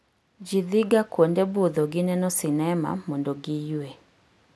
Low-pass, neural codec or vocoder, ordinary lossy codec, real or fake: none; none; none; real